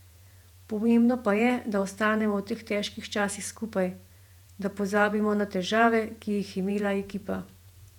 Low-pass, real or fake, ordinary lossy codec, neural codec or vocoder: 19.8 kHz; fake; none; vocoder, 48 kHz, 128 mel bands, Vocos